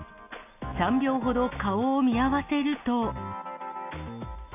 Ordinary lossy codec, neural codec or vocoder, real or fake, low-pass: none; none; real; 3.6 kHz